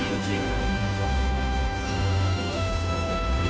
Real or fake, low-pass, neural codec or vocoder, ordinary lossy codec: fake; none; codec, 16 kHz, 0.5 kbps, FunCodec, trained on Chinese and English, 25 frames a second; none